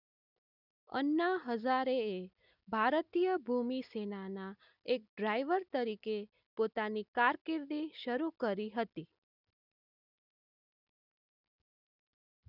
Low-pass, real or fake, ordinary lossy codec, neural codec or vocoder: 5.4 kHz; real; none; none